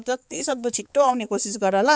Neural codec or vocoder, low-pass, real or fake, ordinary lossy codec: codec, 16 kHz, 4 kbps, X-Codec, HuBERT features, trained on balanced general audio; none; fake; none